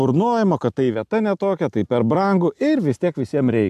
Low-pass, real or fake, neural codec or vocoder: 14.4 kHz; real; none